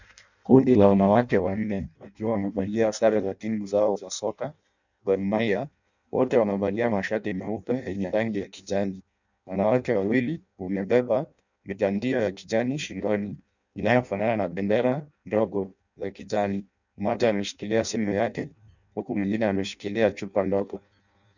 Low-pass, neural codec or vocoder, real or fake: 7.2 kHz; codec, 16 kHz in and 24 kHz out, 0.6 kbps, FireRedTTS-2 codec; fake